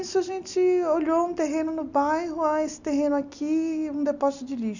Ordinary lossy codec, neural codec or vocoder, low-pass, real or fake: none; none; 7.2 kHz; real